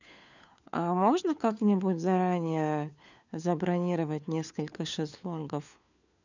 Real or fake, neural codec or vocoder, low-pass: fake; codec, 16 kHz, 4 kbps, FunCodec, trained on LibriTTS, 50 frames a second; 7.2 kHz